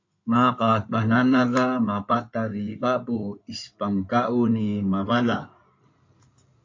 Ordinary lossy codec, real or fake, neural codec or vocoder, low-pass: MP3, 48 kbps; fake; codec, 16 kHz in and 24 kHz out, 2.2 kbps, FireRedTTS-2 codec; 7.2 kHz